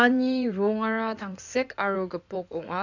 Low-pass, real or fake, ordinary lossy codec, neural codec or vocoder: 7.2 kHz; fake; none; codec, 16 kHz in and 24 kHz out, 2.2 kbps, FireRedTTS-2 codec